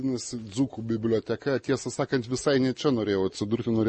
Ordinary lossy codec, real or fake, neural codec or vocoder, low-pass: MP3, 32 kbps; real; none; 10.8 kHz